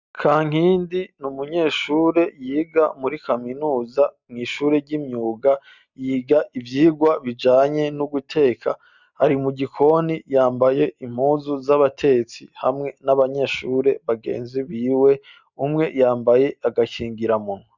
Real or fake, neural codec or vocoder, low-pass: real; none; 7.2 kHz